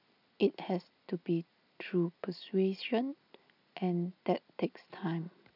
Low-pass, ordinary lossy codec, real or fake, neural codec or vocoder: 5.4 kHz; none; real; none